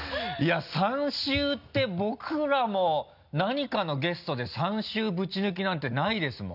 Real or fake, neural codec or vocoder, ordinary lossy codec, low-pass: real; none; none; 5.4 kHz